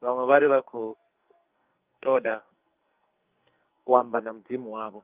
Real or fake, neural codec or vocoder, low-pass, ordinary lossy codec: fake; codec, 44.1 kHz, 2.6 kbps, SNAC; 3.6 kHz; Opus, 32 kbps